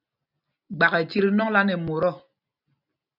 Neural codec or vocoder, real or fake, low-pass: none; real; 5.4 kHz